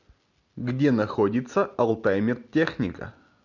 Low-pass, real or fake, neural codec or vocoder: 7.2 kHz; real; none